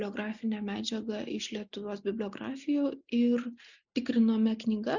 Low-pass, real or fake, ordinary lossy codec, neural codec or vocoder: 7.2 kHz; real; Opus, 64 kbps; none